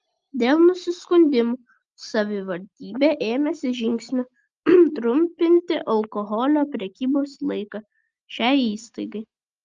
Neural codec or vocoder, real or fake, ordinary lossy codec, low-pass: none; real; Opus, 32 kbps; 7.2 kHz